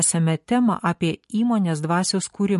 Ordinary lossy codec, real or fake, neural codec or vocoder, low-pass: MP3, 48 kbps; real; none; 14.4 kHz